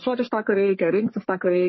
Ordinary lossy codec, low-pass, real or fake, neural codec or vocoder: MP3, 24 kbps; 7.2 kHz; fake; codec, 44.1 kHz, 3.4 kbps, Pupu-Codec